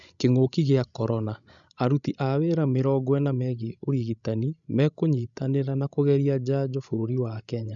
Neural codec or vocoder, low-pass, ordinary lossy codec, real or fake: codec, 16 kHz, 16 kbps, FreqCodec, larger model; 7.2 kHz; none; fake